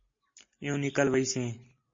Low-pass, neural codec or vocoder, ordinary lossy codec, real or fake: 7.2 kHz; none; MP3, 32 kbps; real